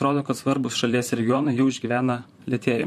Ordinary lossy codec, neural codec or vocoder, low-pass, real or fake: MP3, 64 kbps; vocoder, 44.1 kHz, 128 mel bands, Pupu-Vocoder; 14.4 kHz; fake